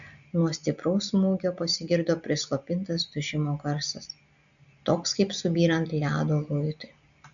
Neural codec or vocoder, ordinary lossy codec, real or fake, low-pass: none; MP3, 96 kbps; real; 7.2 kHz